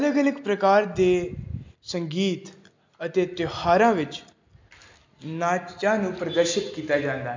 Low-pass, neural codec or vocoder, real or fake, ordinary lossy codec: 7.2 kHz; none; real; MP3, 64 kbps